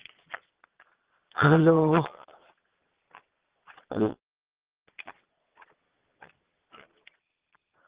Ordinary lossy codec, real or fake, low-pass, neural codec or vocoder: Opus, 16 kbps; fake; 3.6 kHz; vocoder, 22.05 kHz, 80 mel bands, Vocos